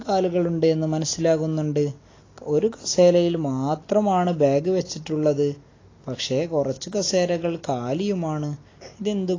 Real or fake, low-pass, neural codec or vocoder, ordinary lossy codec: real; 7.2 kHz; none; AAC, 32 kbps